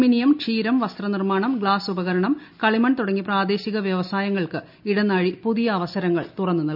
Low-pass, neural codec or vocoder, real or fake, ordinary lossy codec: 5.4 kHz; none; real; none